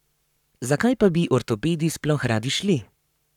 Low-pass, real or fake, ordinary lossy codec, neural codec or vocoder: 19.8 kHz; fake; none; codec, 44.1 kHz, 7.8 kbps, Pupu-Codec